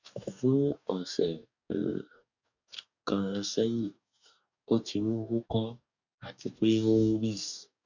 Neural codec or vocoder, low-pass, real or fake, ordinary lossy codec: codec, 44.1 kHz, 2.6 kbps, DAC; 7.2 kHz; fake; none